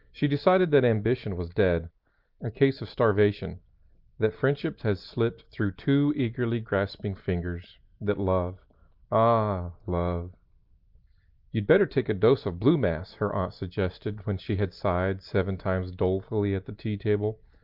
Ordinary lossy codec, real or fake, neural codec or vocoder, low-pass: Opus, 24 kbps; real; none; 5.4 kHz